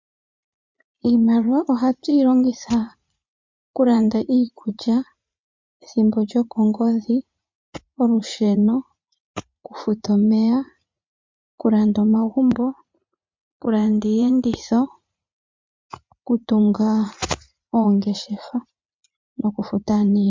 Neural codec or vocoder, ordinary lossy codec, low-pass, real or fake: vocoder, 44.1 kHz, 80 mel bands, Vocos; MP3, 64 kbps; 7.2 kHz; fake